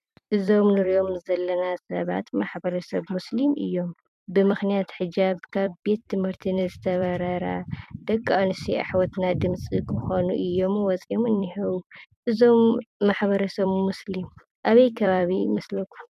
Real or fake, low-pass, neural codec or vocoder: fake; 14.4 kHz; vocoder, 44.1 kHz, 128 mel bands every 256 samples, BigVGAN v2